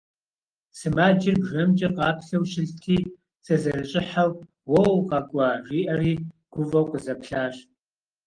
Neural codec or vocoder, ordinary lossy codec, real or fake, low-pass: none; Opus, 24 kbps; real; 9.9 kHz